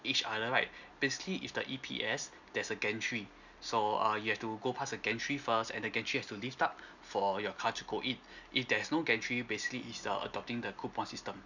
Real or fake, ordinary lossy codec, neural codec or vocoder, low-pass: real; none; none; 7.2 kHz